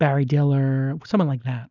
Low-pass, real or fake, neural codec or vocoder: 7.2 kHz; real; none